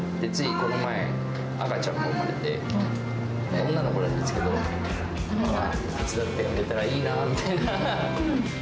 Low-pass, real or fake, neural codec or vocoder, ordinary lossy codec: none; real; none; none